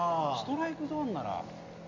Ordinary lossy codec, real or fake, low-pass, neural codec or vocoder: MP3, 48 kbps; real; 7.2 kHz; none